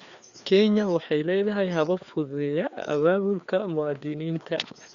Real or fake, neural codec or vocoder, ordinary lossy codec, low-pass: fake; codec, 16 kHz, 2 kbps, FreqCodec, larger model; Opus, 64 kbps; 7.2 kHz